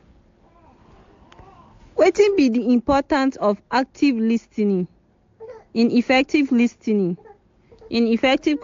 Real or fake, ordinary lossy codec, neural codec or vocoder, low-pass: real; AAC, 48 kbps; none; 7.2 kHz